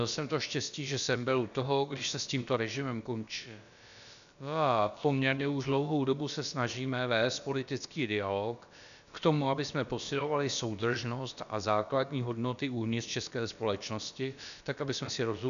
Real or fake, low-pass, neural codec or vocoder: fake; 7.2 kHz; codec, 16 kHz, about 1 kbps, DyCAST, with the encoder's durations